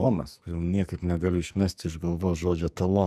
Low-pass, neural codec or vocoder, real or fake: 14.4 kHz; codec, 44.1 kHz, 2.6 kbps, SNAC; fake